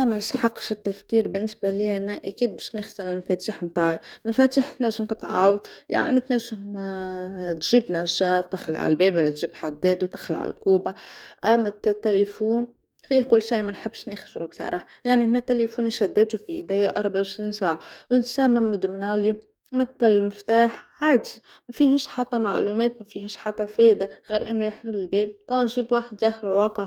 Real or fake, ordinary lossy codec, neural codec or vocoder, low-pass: fake; none; codec, 44.1 kHz, 2.6 kbps, DAC; 19.8 kHz